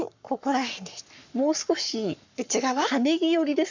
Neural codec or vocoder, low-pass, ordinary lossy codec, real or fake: codec, 44.1 kHz, 7.8 kbps, Pupu-Codec; 7.2 kHz; none; fake